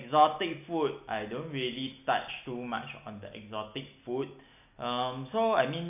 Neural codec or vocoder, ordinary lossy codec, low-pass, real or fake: none; none; 3.6 kHz; real